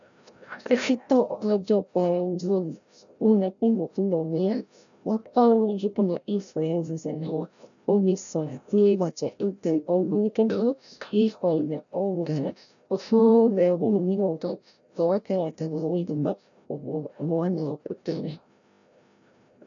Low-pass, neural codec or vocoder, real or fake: 7.2 kHz; codec, 16 kHz, 0.5 kbps, FreqCodec, larger model; fake